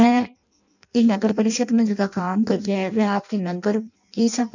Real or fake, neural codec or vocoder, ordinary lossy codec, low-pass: fake; codec, 16 kHz in and 24 kHz out, 0.6 kbps, FireRedTTS-2 codec; none; 7.2 kHz